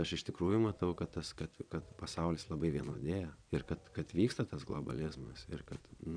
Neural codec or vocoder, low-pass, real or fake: vocoder, 22.05 kHz, 80 mel bands, Vocos; 9.9 kHz; fake